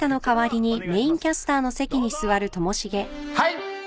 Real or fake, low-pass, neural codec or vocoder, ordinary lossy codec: real; none; none; none